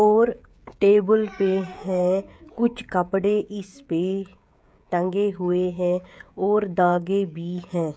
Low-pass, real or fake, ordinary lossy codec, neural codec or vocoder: none; fake; none; codec, 16 kHz, 16 kbps, FreqCodec, smaller model